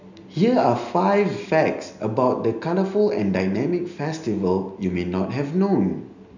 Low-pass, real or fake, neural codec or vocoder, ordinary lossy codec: 7.2 kHz; real; none; none